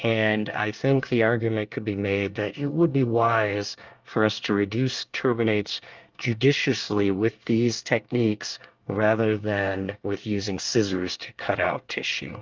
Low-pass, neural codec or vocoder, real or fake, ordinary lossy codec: 7.2 kHz; codec, 24 kHz, 1 kbps, SNAC; fake; Opus, 24 kbps